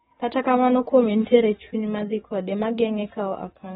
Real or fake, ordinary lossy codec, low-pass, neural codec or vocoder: fake; AAC, 16 kbps; 19.8 kHz; codec, 44.1 kHz, 7.8 kbps, DAC